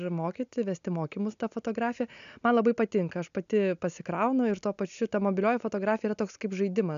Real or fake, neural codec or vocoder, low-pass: real; none; 7.2 kHz